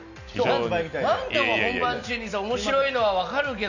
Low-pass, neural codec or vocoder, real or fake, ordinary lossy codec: 7.2 kHz; none; real; none